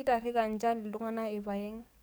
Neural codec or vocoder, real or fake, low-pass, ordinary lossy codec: codec, 44.1 kHz, 7.8 kbps, DAC; fake; none; none